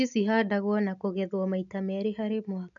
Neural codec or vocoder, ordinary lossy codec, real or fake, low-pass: none; none; real; 7.2 kHz